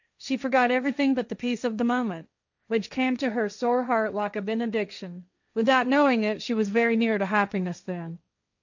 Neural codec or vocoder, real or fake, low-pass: codec, 16 kHz, 1.1 kbps, Voila-Tokenizer; fake; 7.2 kHz